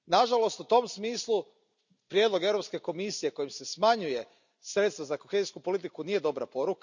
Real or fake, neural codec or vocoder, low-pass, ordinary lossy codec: real; none; 7.2 kHz; none